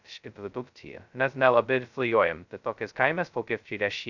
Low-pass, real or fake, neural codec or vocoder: 7.2 kHz; fake; codec, 16 kHz, 0.2 kbps, FocalCodec